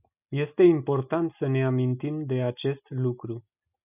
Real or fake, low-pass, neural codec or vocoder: real; 3.6 kHz; none